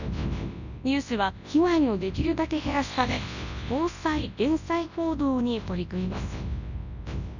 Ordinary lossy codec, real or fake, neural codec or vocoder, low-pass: none; fake; codec, 24 kHz, 0.9 kbps, WavTokenizer, large speech release; 7.2 kHz